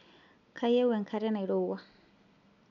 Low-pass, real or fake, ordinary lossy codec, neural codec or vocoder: 7.2 kHz; real; none; none